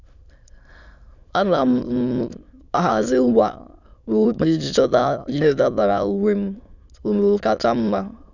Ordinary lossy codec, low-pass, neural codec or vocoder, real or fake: none; 7.2 kHz; autoencoder, 22.05 kHz, a latent of 192 numbers a frame, VITS, trained on many speakers; fake